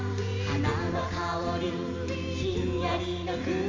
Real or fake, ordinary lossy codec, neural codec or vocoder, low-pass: real; MP3, 48 kbps; none; 7.2 kHz